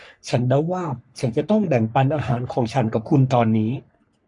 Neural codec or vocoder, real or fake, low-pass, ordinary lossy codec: codec, 44.1 kHz, 3.4 kbps, Pupu-Codec; fake; 10.8 kHz; MP3, 96 kbps